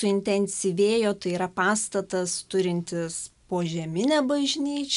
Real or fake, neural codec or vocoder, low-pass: real; none; 10.8 kHz